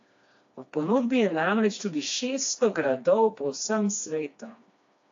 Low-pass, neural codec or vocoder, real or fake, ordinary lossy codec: 7.2 kHz; codec, 16 kHz, 2 kbps, FreqCodec, smaller model; fake; AAC, 48 kbps